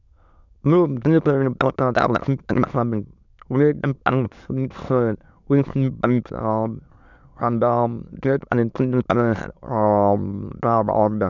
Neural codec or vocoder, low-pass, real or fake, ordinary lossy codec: autoencoder, 22.05 kHz, a latent of 192 numbers a frame, VITS, trained on many speakers; 7.2 kHz; fake; none